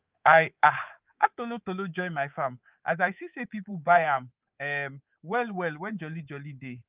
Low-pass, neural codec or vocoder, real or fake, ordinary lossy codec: 3.6 kHz; codec, 24 kHz, 3.1 kbps, DualCodec; fake; Opus, 32 kbps